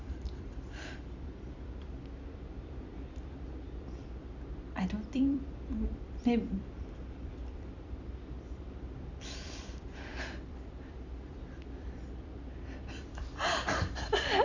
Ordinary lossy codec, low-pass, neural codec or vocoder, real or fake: Opus, 64 kbps; 7.2 kHz; vocoder, 44.1 kHz, 128 mel bands every 256 samples, BigVGAN v2; fake